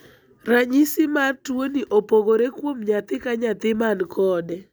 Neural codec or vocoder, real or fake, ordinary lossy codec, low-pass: none; real; none; none